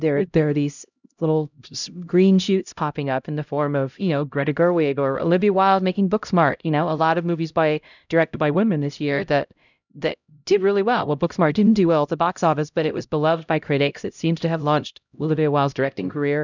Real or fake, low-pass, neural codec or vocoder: fake; 7.2 kHz; codec, 16 kHz, 0.5 kbps, X-Codec, HuBERT features, trained on LibriSpeech